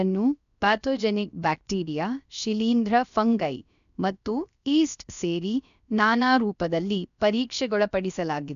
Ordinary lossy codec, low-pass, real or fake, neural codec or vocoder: none; 7.2 kHz; fake; codec, 16 kHz, about 1 kbps, DyCAST, with the encoder's durations